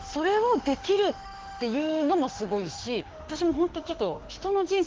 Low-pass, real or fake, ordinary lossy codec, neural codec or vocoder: 7.2 kHz; fake; Opus, 16 kbps; autoencoder, 48 kHz, 32 numbers a frame, DAC-VAE, trained on Japanese speech